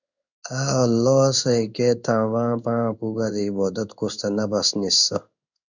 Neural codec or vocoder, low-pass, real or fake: codec, 16 kHz in and 24 kHz out, 1 kbps, XY-Tokenizer; 7.2 kHz; fake